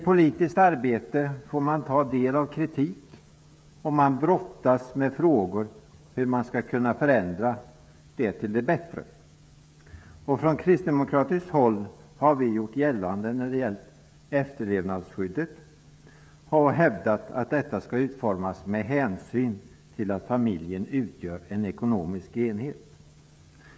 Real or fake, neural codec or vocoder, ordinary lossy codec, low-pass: fake; codec, 16 kHz, 16 kbps, FreqCodec, smaller model; none; none